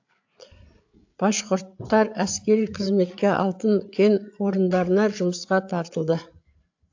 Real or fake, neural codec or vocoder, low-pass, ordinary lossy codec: fake; codec, 16 kHz, 8 kbps, FreqCodec, larger model; 7.2 kHz; AAC, 48 kbps